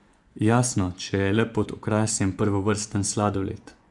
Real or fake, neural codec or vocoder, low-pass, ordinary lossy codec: fake; vocoder, 24 kHz, 100 mel bands, Vocos; 10.8 kHz; none